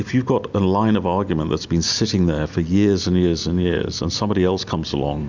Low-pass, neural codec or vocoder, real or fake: 7.2 kHz; none; real